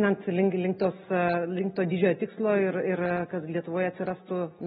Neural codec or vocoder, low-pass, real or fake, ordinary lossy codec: none; 19.8 kHz; real; AAC, 16 kbps